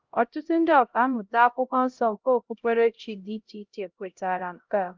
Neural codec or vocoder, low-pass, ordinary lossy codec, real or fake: codec, 16 kHz, 0.5 kbps, X-Codec, HuBERT features, trained on LibriSpeech; 7.2 kHz; Opus, 32 kbps; fake